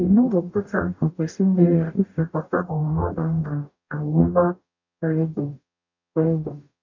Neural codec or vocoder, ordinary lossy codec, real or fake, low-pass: codec, 44.1 kHz, 0.9 kbps, DAC; none; fake; 7.2 kHz